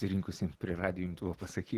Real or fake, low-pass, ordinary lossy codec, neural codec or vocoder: real; 14.4 kHz; Opus, 16 kbps; none